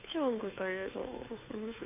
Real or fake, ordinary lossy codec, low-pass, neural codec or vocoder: fake; none; 3.6 kHz; codec, 16 kHz, 2 kbps, FunCodec, trained on Chinese and English, 25 frames a second